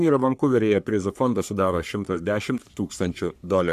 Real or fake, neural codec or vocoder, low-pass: fake; codec, 44.1 kHz, 3.4 kbps, Pupu-Codec; 14.4 kHz